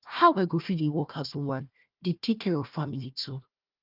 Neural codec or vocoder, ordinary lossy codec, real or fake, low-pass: codec, 16 kHz, 1 kbps, FunCodec, trained on Chinese and English, 50 frames a second; Opus, 24 kbps; fake; 5.4 kHz